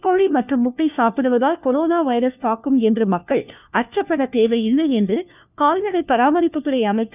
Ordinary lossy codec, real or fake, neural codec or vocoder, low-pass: none; fake; codec, 16 kHz, 1 kbps, FunCodec, trained on LibriTTS, 50 frames a second; 3.6 kHz